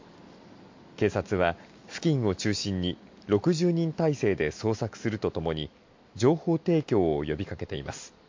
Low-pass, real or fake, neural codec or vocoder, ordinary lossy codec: 7.2 kHz; real; none; MP3, 48 kbps